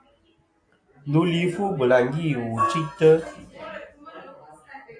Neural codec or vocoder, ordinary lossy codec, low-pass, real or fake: none; AAC, 64 kbps; 9.9 kHz; real